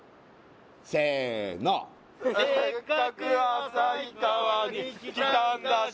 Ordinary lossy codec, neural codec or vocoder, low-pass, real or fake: none; none; none; real